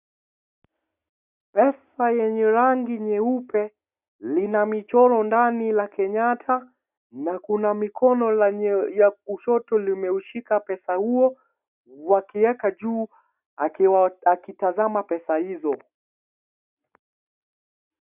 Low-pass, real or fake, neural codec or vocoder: 3.6 kHz; real; none